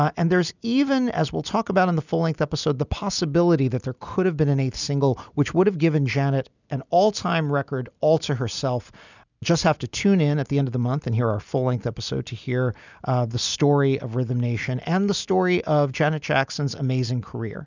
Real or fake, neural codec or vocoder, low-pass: real; none; 7.2 kHz